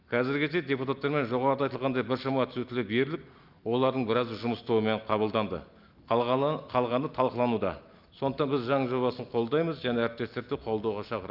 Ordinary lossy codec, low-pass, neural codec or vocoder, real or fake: Opus, 24 kbps; 5.4 kHz; none; real